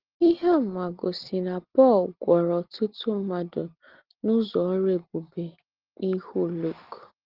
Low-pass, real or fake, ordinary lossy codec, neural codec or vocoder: 5.4 kHz; real; Opus, 16 kbps; none